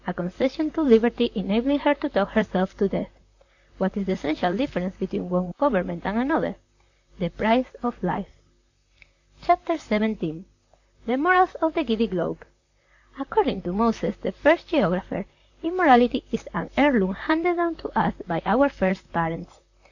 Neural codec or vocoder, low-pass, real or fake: vocoder, 44.1 kHz, 128 mel bands, Pupu-Vocoder; 7.2 kHz; fake